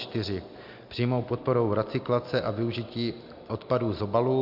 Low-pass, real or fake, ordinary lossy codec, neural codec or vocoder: 5.4 kHz; real; MP3, 48 kbps; none